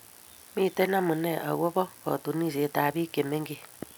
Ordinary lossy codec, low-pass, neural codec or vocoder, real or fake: none; none; none; real